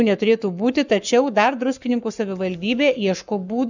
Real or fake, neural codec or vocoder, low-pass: fake; codec, 44.1 kHz, 7.8 kbps, Pupu-Codec; 7.2 kHz